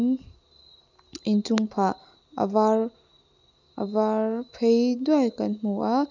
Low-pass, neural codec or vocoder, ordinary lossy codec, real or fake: 7.2 kHz; none; none; real